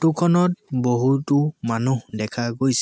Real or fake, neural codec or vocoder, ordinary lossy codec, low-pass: real; none; none; none